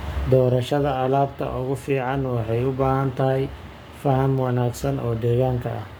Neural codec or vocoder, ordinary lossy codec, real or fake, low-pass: codec, 44.1 kHz, 7.8 kbps, Pupu-Codec; none; fake; none